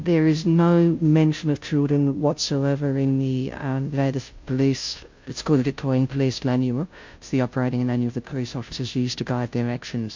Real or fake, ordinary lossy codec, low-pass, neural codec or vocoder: fake; MP3, 48 kbps; 7.2 kHz; codec, 16 kHz, 0.5 kbps, FunCodec, trained on Chinese and English, 25 frames a second